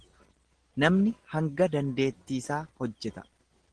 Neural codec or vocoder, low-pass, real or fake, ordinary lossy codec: none; 9.9 kHz; real; Opus, 16 kbps